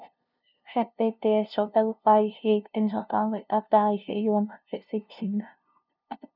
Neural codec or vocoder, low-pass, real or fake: codec, 16 kHz, 0.5 kbps, FunCodec, trained on LibriTTS, 25 frames a second; 5.4 kHz; fake